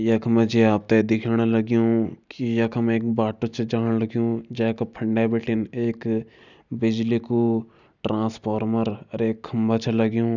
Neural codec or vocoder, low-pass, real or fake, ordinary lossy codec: none; 7.2 kHz; real; none